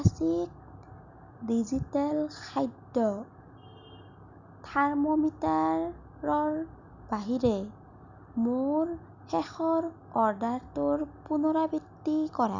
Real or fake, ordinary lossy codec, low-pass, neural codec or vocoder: real; none; 7.2 kHz; none